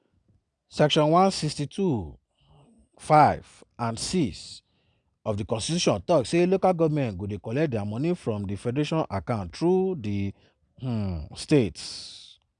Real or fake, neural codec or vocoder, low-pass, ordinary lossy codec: real; none; 9.9 kHz; none